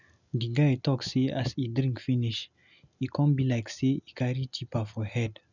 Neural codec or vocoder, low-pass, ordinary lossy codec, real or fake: none; 7.2 kHz; none; real